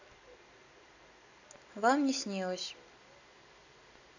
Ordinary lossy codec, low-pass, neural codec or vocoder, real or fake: none; 7.2 kHz; none; real